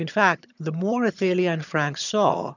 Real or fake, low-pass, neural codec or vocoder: fake; 7.2 kHz; vocoder, 22.05 kHz, 80 mel bands, HiFi-GAN